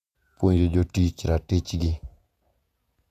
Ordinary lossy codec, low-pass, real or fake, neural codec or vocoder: none; 14.4 kHz; fake; vocoder, 48 kHz, 128 mel bands, Vocos